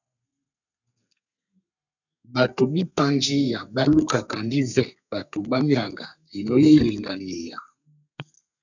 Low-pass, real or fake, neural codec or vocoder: 7.2 kHz; fake; codec, 32 kHz, 1.9 kbps, SNAC